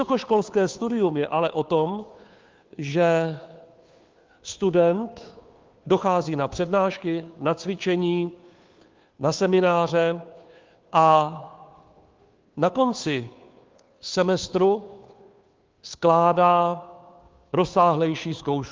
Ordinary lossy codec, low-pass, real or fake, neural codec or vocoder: Opus, 32 kbps; 7.2 kHz; fake; codec, 16 kHz, 2 kbps, FunCodec, trained on Chinese and English, 25 frames a second